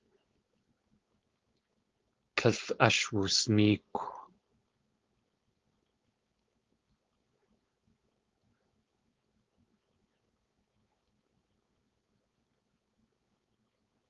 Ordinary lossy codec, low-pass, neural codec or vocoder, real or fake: Opus, 32 kbps; 7.2 kHz; codec, 16 kHz, 4.8 kbps, FACodec; fake